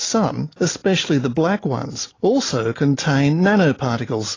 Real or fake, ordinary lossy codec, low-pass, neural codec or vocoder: real; AAC, 32 kbps; 7.2 kHz; none